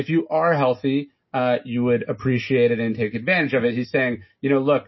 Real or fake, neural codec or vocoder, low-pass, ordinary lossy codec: real; none; 7.2 kHz; MP3, 24 kbps